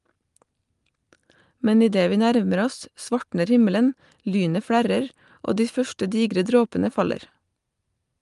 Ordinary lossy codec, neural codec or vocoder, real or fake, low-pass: Opus, 32 kbps; none; real; 10.8 kHz